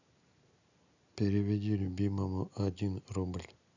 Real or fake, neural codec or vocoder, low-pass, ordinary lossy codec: real; none; 7.2 kHz; none